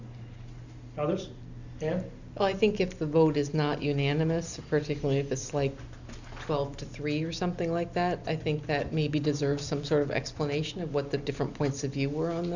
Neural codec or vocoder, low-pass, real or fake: none; 7.2 kHz; real